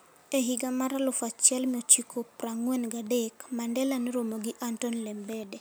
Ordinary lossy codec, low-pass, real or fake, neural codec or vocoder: none; none; real; none